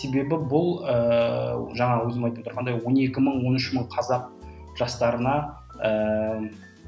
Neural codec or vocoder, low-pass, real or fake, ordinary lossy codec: none; none; real; none